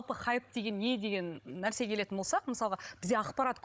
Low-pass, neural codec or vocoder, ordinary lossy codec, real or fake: none; codec, 16 kHz, 16 kbps, FunCodec, trained on Chinese and English, 50 frames a second; none; fake